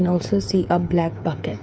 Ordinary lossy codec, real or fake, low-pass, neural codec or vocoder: none; fake; none; codec, 16 kHz, 8 kbps, FreqCodec, smaller model